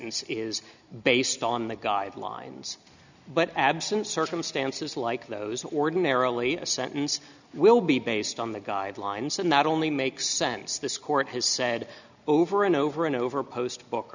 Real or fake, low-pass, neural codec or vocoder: real; 7.2 kHz; none